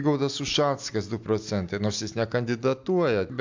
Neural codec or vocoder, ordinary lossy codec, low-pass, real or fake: none; MP3, 64 kbps; 7.2 kHz; real